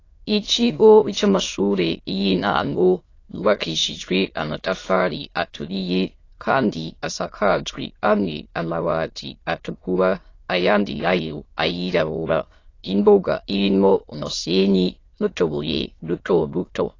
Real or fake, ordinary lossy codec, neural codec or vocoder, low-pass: fake; AAC, 32 kbps; autoencoder, 22.05 kHz, a latent of 192 numbers a frame, VITS, trained on many speakers; 7.2 kHz